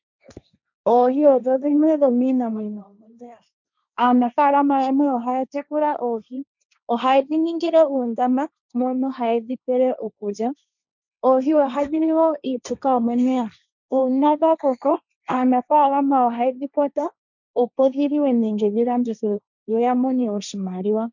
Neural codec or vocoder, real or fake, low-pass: codec, 16 kHz, 1.1 kbps, Voila-Tokenizer; fake; 7.2 kHz